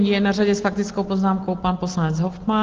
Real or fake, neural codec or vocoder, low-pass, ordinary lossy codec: real; none; 7.2 kHz; Opus, 16 kbps